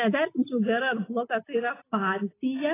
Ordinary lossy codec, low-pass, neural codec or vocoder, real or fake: AAC, 16 kbps; 3.6 kHz; none; real